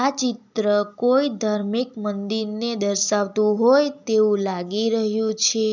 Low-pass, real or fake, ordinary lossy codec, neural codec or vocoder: 7.2 kHz; real; none; none